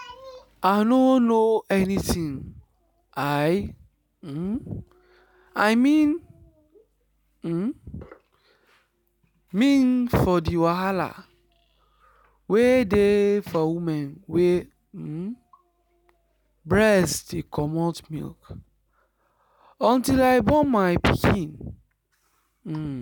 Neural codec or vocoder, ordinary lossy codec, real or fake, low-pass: none; none; real; 19.8 kHz